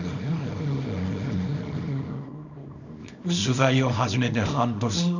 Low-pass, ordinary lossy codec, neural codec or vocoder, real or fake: 7.2 kHz; none; codec, 24 kHz, 0.9 kbps, WavTokenizer, small release; fake